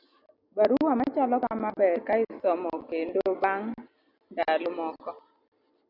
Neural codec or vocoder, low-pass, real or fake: none; 5.4 kHz; real